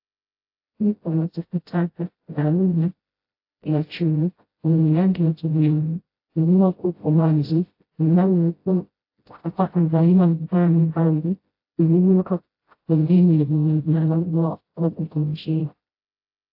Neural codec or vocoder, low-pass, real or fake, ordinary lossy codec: codec, 16 kHz, 0.5 kbps, FreqCodec, smaller model; 5.4 kHz; fake; AAC, 32 kbps